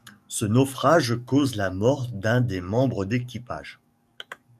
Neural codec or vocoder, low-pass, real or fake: codec, 44.1 kHz, 7.8 kbps, DAC; 14.4 kHz; fake